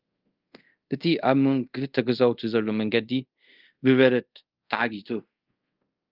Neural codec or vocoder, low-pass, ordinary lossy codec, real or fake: codec, 24 kHz, 0.5 kbps, DualCodec; 5.4 kHz; Opus, 24 kbps; fake